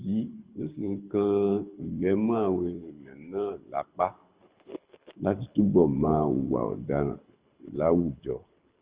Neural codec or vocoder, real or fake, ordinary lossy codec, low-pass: codec, 24 kHz, 6 kbps, HILCodec; fake; Opus, 24 kbps; 3.6 kHz